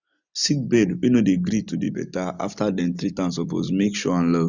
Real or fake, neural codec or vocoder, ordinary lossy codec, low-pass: real; none; none; 7.2 kHz